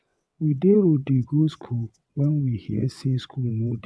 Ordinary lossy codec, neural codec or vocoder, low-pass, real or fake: none; vocoder, 22.05 kHz, 80 mel bands, WaveNeXt; none; fake